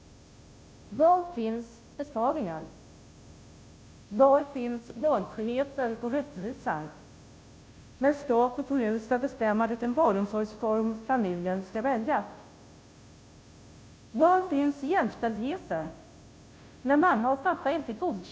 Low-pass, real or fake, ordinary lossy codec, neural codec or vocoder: none; fake; none; codec, 16 kHz, 0.5 kbps, FunCodec, trained on Chinese and English, 25 frames a second